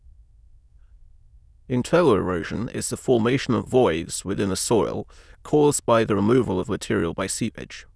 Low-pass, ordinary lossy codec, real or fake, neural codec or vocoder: none; none; fake; autoencoder, 22.05 kHz, a latent of 192 numbers a frame, VITS, trained on many speakers